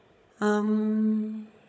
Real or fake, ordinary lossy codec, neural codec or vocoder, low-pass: fake; none; codec, 16 kHz, 16 kbps, FunCodec, trained on Chinese and English, 50 frames a second; none